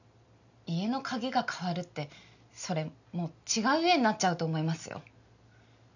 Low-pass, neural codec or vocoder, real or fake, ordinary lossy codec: 7.2 kHz; none; real; none